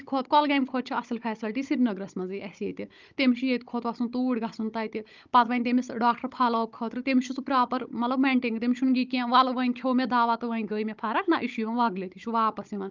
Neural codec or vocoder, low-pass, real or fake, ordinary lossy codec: codec, 16 kHz, 16 kbps, FunCodec, trained on Chinese and English, 50 frames a second; 7.2 kHz; fake; Opus, 32 kbps